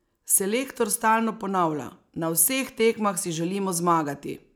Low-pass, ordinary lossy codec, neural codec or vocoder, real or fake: none; none; none; real